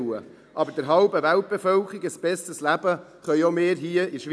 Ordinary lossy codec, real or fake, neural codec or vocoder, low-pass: none; real; none; none